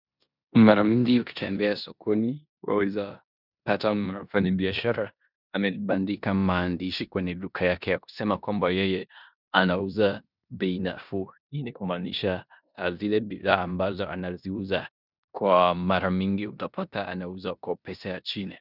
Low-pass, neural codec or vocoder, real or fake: 5.4 kHz; codec, 16 kHz in and 24 kHz out, 0.9 kbps, LongCat-Audio-Codec, four codebook decoder; fake